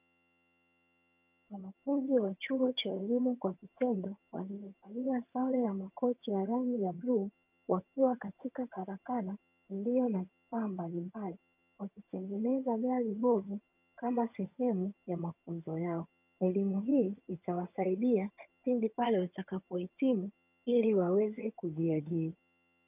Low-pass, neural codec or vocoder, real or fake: 3.6 kHz; vocoder, 22.05 kHz, 80 mel bands, HiFi-GAN; fake